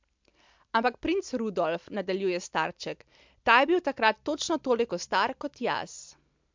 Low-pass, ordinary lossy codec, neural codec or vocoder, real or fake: 7.2 kHz; MP3, 64 kbps; none; real